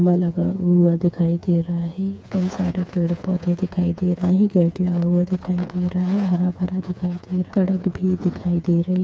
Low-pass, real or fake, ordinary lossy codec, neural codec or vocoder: none; fake; none; codec, 16 kHz, 4 kbps, FreqCodec, smaller model